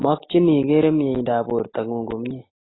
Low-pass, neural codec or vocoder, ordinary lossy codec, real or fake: 7.2 kHz; none; AAC, 16 kbps; real